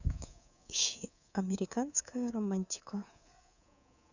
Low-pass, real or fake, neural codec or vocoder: 7.2 kHz; fake; codec, 24 kHz, 3.1 kbps, DualCodec